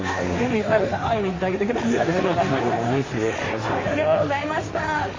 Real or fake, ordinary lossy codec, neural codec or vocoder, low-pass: fake; MP3, 32 kbps; codec, 16 kHz, 1.1 kbps, Voila-Tokenizer; 7.2 kHz